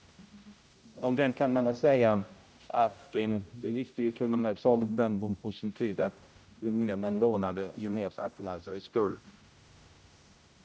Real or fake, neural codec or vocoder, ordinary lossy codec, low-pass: fake; codec, 16 kHz, 0.5 kbps, X-Codec, HuBERT features, trained on general audio; none; none